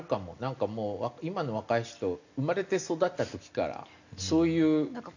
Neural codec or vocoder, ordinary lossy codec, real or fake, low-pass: none; none; real; 7.2 kHz